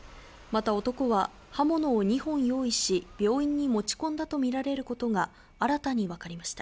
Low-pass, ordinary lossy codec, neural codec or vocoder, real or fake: none; none; none; real